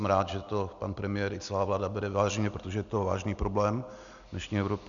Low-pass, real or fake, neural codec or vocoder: 7.2 kHz; real; none